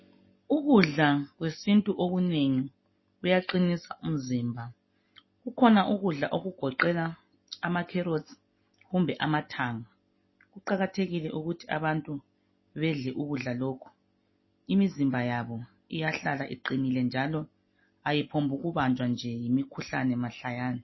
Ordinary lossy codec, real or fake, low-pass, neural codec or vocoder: MP3, 24 kbps; real; 7.2 kHz; none